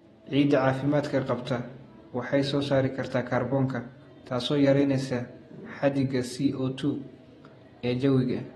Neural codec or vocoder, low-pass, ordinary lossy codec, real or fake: vocoder, 48 kHz, 128 mel bands, Vocos; 19.8 kHz; AAC, 32 kbps; fake